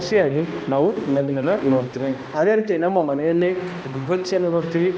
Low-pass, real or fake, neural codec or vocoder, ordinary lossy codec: none; fake; codec, 16 kHz, 1 kbps, X-Codec, HuBERT features, trained on balanced general audio; none